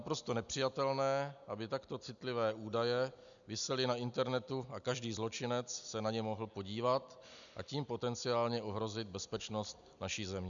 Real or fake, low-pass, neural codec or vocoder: real; 7.2 kHz; none